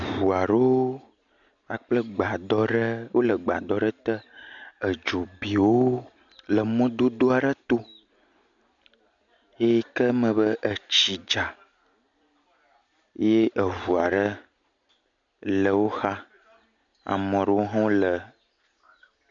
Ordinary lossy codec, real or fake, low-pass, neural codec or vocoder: MP3, 64 kbps; real; 7.2 kHz; none